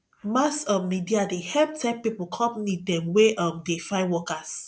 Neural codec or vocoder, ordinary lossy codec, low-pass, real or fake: none; none; none; real